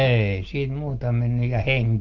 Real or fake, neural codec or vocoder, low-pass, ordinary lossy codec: real; none; 7.2 kHz; Opus, 16 kbps